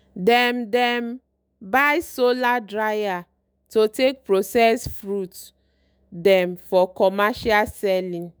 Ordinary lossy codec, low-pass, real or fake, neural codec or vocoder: none; none; fake; autoencoder, 48 kHz, 128 numbers a frame, DAC-VAE, trained on Japanese speech